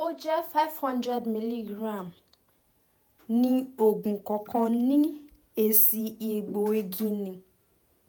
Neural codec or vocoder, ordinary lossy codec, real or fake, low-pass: vocoder, 48 kHz, 128 mel bands, Vocos; none; fake; none